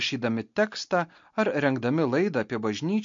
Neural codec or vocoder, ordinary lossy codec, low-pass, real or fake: none; MP3, 48 kbps; 7.2 kHz; real